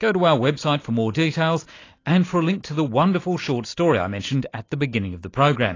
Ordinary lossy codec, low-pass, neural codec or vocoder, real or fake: AAC, 32 kbps; 7.2 kHz; none; real